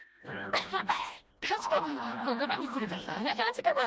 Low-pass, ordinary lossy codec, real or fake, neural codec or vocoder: none; none; fake; codec, 16 kHz, 1 kbps, FreqCodec, smaller model